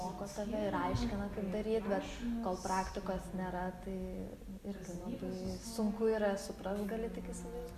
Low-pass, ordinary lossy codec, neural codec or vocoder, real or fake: 14.4 kHz; Opus, 64 kbps; none; real